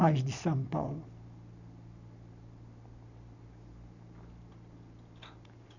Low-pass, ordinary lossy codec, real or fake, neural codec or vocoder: 7.2 kHz; none; real; none